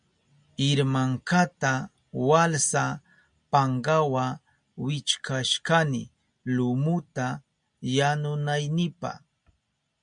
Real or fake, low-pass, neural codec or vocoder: real; 9.9 kHz; none